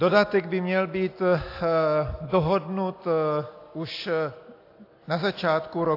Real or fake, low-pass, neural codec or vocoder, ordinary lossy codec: real; 5.4 kHz; none; AAC, 32 kbps